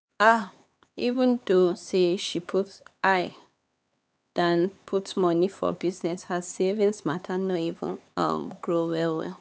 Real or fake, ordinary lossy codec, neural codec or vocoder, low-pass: fake; none; codec, 16 kHz, 4 kbps, X-Codec, HuBERT features, trained on LibriSpeech; none